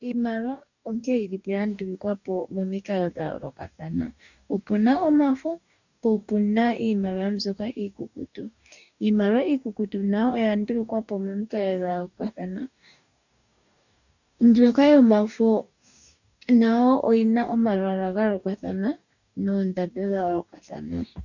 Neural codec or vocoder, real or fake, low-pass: codec, 44.1 kHz, 2.6 kbps, DAC; fake; 7.2 kHz